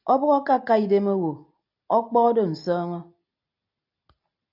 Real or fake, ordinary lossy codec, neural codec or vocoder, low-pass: real; AAC, 48 kbps; none; 5.4 kHz